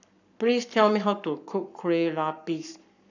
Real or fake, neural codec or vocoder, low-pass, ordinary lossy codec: fake; codec, 44.1 kHz, 7.8 kbps, Pupu-Codec; 7.2 kHz; none